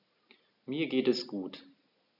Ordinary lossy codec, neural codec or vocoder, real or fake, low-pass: none; none; real; 5.4 kHz